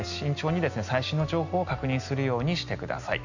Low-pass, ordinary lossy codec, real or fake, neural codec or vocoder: 7.2 kHz; none; real; none